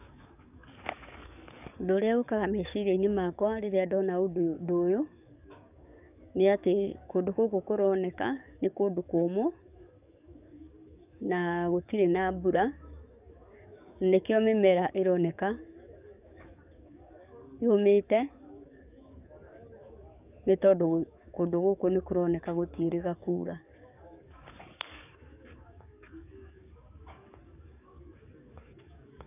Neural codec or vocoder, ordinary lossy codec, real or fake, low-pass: codec, 44.1 kHz, 7.8 kbps, DAC; none; fake; 3.6 kHz